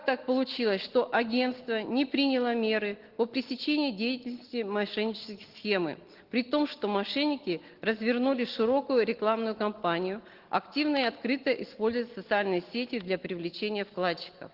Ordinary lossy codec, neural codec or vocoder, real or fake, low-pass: Opus, 16 kbps; none; real; 5.4 kHz